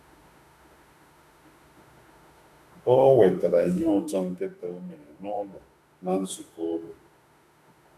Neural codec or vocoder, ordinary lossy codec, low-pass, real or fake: autoencoder, 48 kHz, 32 numbers a frame, DAC-VAE, trained on Japanese speech; none; 14.4 kHz; fake